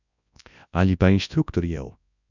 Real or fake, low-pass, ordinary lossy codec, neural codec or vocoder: fake; 7.2 kHz; none; codec, 24 kHz, 0.9 kbps, WavTokenizer, large speech release